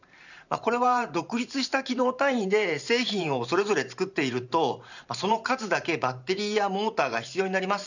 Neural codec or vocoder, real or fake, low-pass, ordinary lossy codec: vocoder, 44.1 kHz, 128 mel bands every 512 samples, BigVGAN v2; fake; 7.2 kHz; none